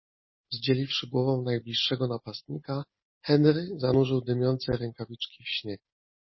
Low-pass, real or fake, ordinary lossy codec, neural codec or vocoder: 7.2 kHz; real; MP3, 24 kbps; none